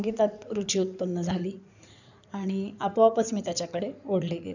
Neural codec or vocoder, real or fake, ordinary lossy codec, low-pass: codec, 16 kHz in and 24 kHz out, 2.2 kbps, FireRedTTS-2 codec; fake; none; 7.2 kHz